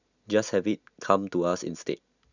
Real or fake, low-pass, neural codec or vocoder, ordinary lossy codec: real; 7.2 kHz; none; none